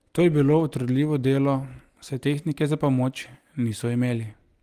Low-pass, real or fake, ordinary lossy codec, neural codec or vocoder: 14.4 kHz; real; Opus, 24 kbps; none